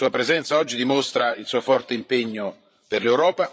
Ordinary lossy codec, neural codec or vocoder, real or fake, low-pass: none; codec, 16 kHz, 8 kbps, FreqCodec, larger model; fake; none